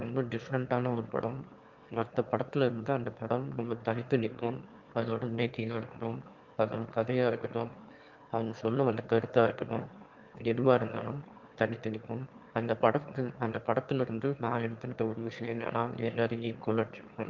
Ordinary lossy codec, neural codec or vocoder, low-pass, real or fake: Opus, 24 kbps; autoencoder, 22.05 kHz, a latent of 192 numbers a frame, VITS, trained on one speaker; 7.2 kHz; fake